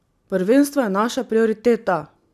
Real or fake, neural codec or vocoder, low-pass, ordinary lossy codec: real; none; 14.4 kHz; none